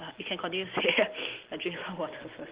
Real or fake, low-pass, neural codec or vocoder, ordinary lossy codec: real; 3.6 kHz; none; Opus, 16 kbps